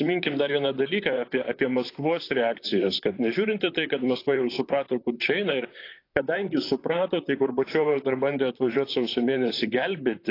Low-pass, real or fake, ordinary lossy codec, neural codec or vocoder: 5.4 kHz; fake; AAC, 32 kbps; vocoder, 44.1 kHz, 128 mel bands, Pupu-Vocoder